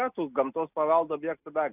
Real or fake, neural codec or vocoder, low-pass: real; none; 3.6 kHz